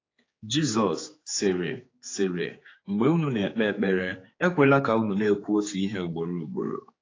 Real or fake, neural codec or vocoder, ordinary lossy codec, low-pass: fake; codec, 16 kHz, 4 kbps, X-Codec, HuBERT features, trained on general audio; AAC, 32 kbps; 7.2 kHz